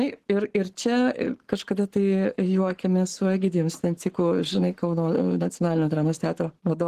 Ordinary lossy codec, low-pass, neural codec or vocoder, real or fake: Opus, 16 kbps; 14.4 kHz; codec, 44.1 kHz, 7.8 kbps, Pupu-Codec; fake